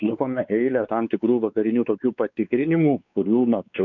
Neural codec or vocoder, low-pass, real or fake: codec, 16 kHz, 4 kbps, X-Codec, WavLM features, trained on Multilingual LibriSpeech; 7.2 kHz; fake